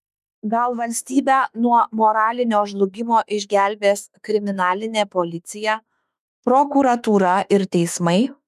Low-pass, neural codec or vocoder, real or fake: 14.4 kHz; autoencoder, 48 kHz, 32 numbers a frame, DAC-VAE, trained on Japanese speech; fake